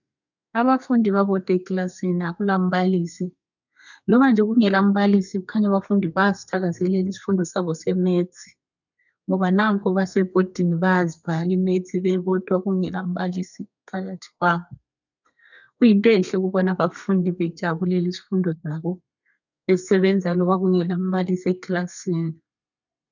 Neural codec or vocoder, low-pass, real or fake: codec, 32 kHz, 1.9 kbps, SNAC; 7.2 kHz; fake